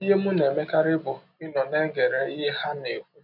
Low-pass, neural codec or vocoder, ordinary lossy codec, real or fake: 5.4 kHz; none; none; real